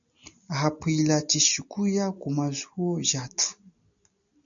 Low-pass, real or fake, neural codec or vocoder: 7.2 kHz; real; none